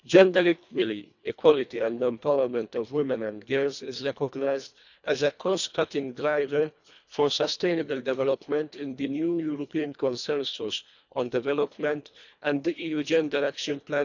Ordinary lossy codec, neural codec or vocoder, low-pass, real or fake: none; codec, 24 kHz, 1.5 kbps, HILCodec; 7.2 kHz; fake